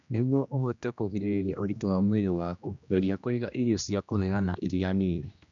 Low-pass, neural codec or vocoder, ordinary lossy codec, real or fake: 7.2 kHz; codec, 16 kHz, 1 kbps, X-Codec, HuBERT features, trained on general audio; none; fake